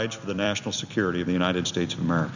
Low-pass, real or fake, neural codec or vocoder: 7.2 kHz; fake; vocoder, 44.1 kHz, 128 mel bands every 256 samples, BigVGAN v2